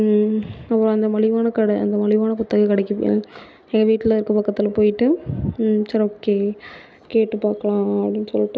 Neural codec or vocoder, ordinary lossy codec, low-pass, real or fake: none; none; none; real